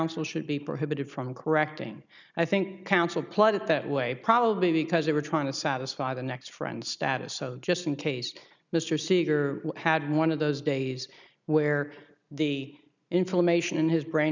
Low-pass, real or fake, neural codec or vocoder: 7.2 kHz; real; none